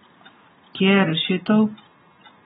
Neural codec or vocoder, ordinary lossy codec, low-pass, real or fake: none; AAC, 16 kbps; 19.8 kHz; real